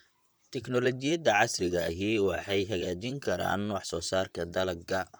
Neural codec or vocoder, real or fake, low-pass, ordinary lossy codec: vocoder, 44.1 kHz, 128 mel bands, Pupu-Vocoder; fake; none; none